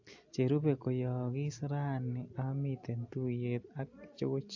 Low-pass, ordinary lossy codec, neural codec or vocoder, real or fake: 7.2 kHz; AAC, 48 kbps; none; real